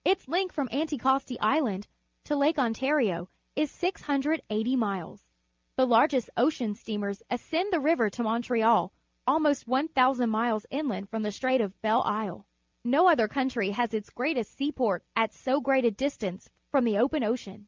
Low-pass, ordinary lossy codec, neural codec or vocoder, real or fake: 7.2 kHz; Opus, 24 kbps; none; real